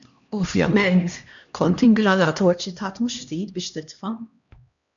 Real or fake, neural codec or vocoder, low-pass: fake; codec, 16 kHz, 1 kbps, X-Codec, HuBERT features, trained on LibriSpeech; 7.2 kHz